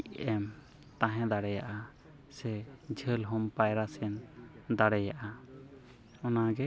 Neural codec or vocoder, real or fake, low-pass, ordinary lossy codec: none; real; none; none